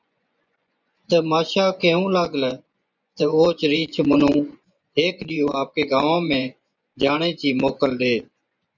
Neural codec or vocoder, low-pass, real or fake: vocoder, 24 kHz, 100 mel bands, Vocos; 7.2 kHz; fake